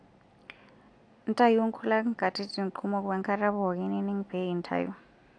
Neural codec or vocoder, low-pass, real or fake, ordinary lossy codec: none; 9.9 kHz; real; AAC, 48 kbps